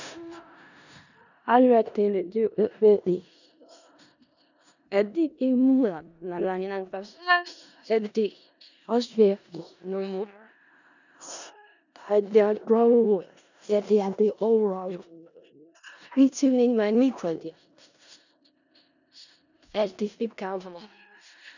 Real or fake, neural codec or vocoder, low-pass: fake; codec, 16 kHz in and 24 kHz out, 0.4 kbps, LongCat-Audio-Codec, four codebook decoder; 7.2 kHz